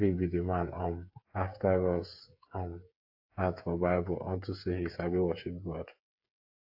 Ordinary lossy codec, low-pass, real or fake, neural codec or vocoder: AAC, 48 kbps; 5.4 kHz; fake; codec, 16 kHz, 8 kbps, FreqCodec, smaller model